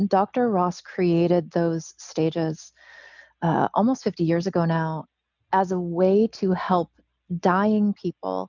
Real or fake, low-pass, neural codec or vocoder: real; 7.2 kHz; none